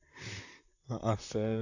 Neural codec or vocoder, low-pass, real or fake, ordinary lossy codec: vocoder, 44.1 kHz, 128 mel bands, Pupu-Vocoder; 7.2 kHz; fake; none